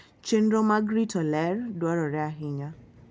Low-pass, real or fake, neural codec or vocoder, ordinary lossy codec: none; real; none; none